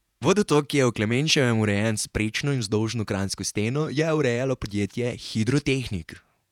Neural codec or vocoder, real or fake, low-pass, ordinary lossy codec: vocoder, 48 kHz, 128 mel bands, Vocos; fake; 19.8 kHz; none